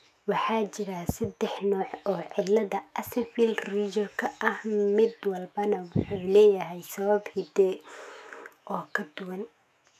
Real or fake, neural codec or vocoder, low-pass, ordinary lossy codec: fake; autoencoder, 48 kHz, 128 numbers a frame, DAC-VAE, trained on Japanese speech; 14.4 kHz; none